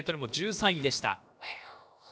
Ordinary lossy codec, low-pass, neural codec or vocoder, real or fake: none; none; codec, 16 kHz, about 1 kbps, DyCAST, with the encoder's durations; fake